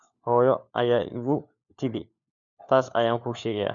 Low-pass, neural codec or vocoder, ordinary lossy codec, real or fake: 7.2 kHz; codec, 16 kHz, 4 kbps, FunCodec, trained on LibriTTS, 50 frames a second; none; fake